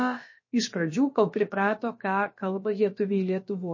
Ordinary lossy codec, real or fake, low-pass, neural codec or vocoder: MP3, 32 kbps; fake; 7.2 kHz; codec, 16 kHz, about 1 kbps, DyCAST, with the encoder's durations